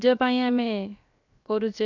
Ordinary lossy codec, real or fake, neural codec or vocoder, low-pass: none; fake; codec, 16 kHz, 0.7 kbps, FocalCodec; 7.2 kHz